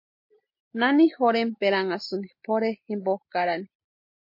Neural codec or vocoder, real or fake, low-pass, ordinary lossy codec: none; real; 5.4 kHz; MP3, 32 kbps